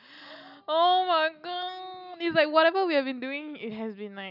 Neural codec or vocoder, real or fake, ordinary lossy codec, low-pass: none; real; none; 5.4 kHz